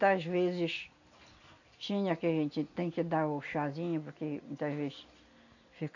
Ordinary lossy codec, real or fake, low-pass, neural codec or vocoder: none; real; 7.2 kHz; none